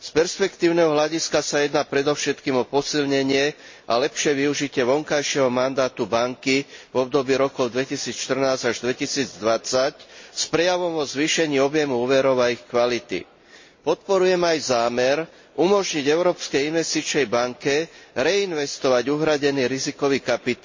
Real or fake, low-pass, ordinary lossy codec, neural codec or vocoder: real; 7.2 kHz; MP3, 32 kbps; none